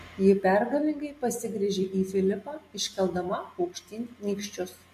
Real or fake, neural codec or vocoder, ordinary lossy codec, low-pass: real; none; MP3, 64 kbps; 14.4 kHz